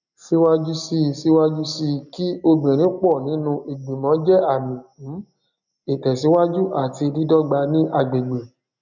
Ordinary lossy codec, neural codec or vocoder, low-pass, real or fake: none; none; 7.2 kHz; real